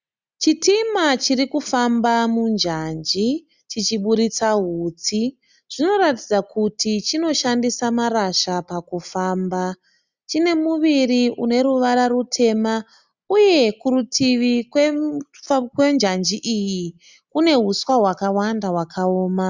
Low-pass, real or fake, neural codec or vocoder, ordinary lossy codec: 7.2 kHz; real; none; Opus, 64 kbps